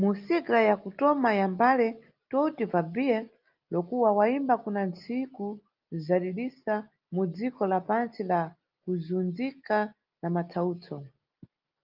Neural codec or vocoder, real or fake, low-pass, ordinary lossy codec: none; real; 5.4 kHz; Opus, 24 kbps